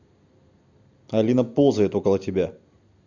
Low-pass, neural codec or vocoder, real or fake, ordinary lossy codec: 7.2 kHz; none; real; Opus, 64 kbps